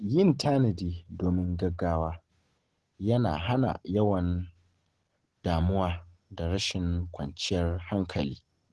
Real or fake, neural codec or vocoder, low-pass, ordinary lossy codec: real; none; 10.8 kHz; Opus, 16 kbps